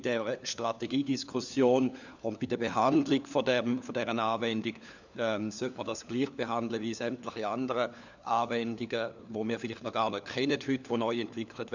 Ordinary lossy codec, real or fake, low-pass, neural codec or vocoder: none; fake; 7.2 kHz; codec, 16 kHz, 4 kbps, FunCodec, trained on LibriTTS, 50 frames a second